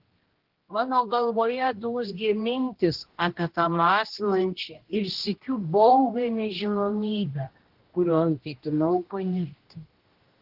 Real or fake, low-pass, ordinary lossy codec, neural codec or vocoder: fake; 5.4 kHz; Opus, 16 kbps; codec, 16 kHz, 1 kbps, X-Codec, HuBERT features, trained on general audio